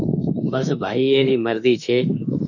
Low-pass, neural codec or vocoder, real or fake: 7.2 kHz; autoencoder, 48 kHz, 32 numbers a frame, DAC-VAE, trained on Japanese speech; fake